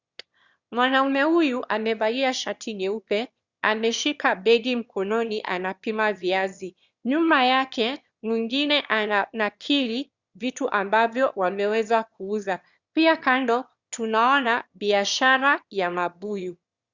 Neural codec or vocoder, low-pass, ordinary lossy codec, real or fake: autoencoder, 22.05 kHz, a latent of 192 numbers a frame, VITS, trained on one speaker; 7.2 kHz; Opus, 64 kbps; fake